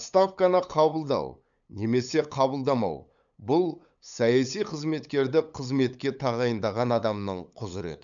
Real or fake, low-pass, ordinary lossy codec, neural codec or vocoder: fake; 7.2 kHz; none; codec, 16 kHz, 8 kbps, FunCodec, trained on LibriTTS, 25 frames a second